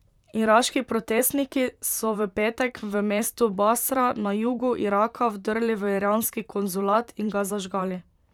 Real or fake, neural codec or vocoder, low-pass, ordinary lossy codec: fake; vocoder, 44.1 kHz, 128 mel bands, Pupu-Vocoder; 19.8 kHz; none